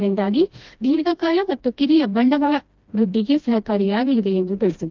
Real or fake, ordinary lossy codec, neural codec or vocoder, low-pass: fake; Opus, 24 kbps; codec, 16 kHz, 1 kbps, FreqCodec, smaller model; 7.2 kHz